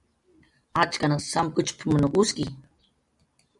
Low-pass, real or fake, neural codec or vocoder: 10.8 kHz; real; none